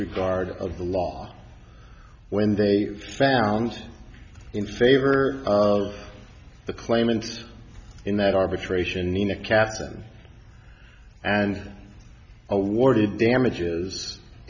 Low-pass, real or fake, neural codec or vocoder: 7.2 kHz; real; none